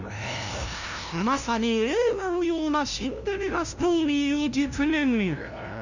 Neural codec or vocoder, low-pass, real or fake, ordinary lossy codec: codec, 16 kHz, 0.5 kbps, FunCodec, trained on LibriTTS, 25 frames a second; 7.2 kHz; fake; none